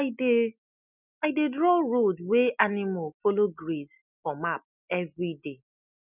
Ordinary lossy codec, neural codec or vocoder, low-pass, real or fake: none; none; 3.6 kHz; real